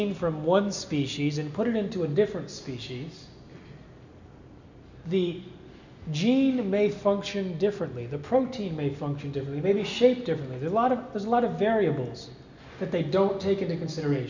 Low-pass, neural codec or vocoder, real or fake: 7.2 kHz; none; real